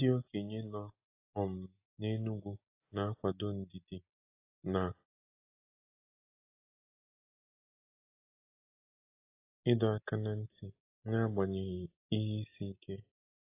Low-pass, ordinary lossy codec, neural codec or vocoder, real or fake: 3.6 kHz; AAC, 32 kbps; none; real